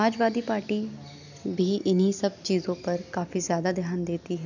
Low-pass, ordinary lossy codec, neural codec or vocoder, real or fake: 7.2 kHz; none; none; real